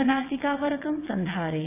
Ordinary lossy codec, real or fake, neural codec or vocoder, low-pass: AAC, 24 kbps; fake; vocoder, 22.05 kHz, 80 mel bands, WaveNeXt; 3.6 kHz